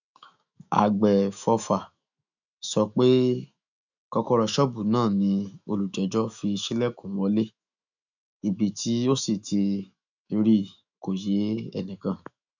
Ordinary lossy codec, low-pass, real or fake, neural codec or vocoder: none; 7.2 kHz; fake; autoencoder, 48 kHz, 128 numbers a frame, DAC-VAE, trained on Japanese speech